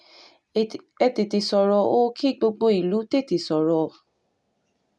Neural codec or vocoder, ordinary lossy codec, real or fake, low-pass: none; none; real; none